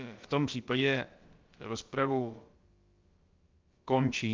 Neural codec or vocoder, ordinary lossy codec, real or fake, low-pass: codec, 16 kHz, about 1 kbps, DyCAST, with the encoder's durations; Opus, 32 kbps; fake; 7.2 kHz